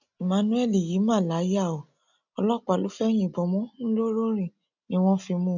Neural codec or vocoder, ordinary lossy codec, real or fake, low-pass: none; none; real; 7.2 kHz